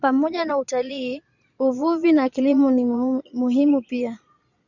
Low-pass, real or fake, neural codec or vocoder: 7.2 kHz; fake; vocoder, 22.05 kHz, 80 mel bands, Vocos